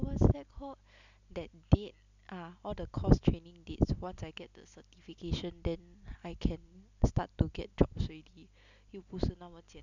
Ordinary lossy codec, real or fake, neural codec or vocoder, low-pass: none; real; none; 7.2 kHz